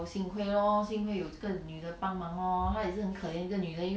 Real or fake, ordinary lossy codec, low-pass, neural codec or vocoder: real; none; none; none